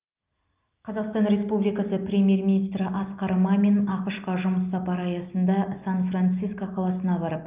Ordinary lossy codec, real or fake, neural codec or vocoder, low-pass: Opus, 24 kbps; real; none; 3.6 kHz